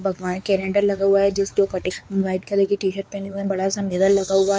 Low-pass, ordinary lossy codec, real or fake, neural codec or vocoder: none; none; fake; codec, 16 kHz, 4 kbps, X-Codec, WavLM features, trained on Multilingual LibriSpeech